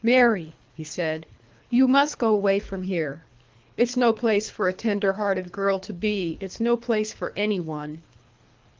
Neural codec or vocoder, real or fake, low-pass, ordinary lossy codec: codec, 24 kHz, 3 kbps, HILCodec; fake; 7.2 kHz; Opus, 32 kbps